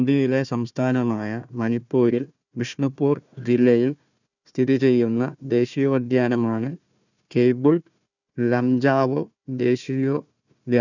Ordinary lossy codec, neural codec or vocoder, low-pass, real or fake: none; codec, 16 kHz, 1 kbps, FunCodec, trained on Chinese and English, 50 frames a second; 7.2 kHz; fake